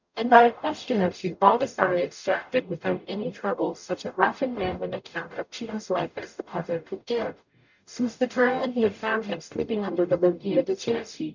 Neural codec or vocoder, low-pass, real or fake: codec, 44.1 kHz, 0.9 kbps, DAC; 7.2 kHz; fake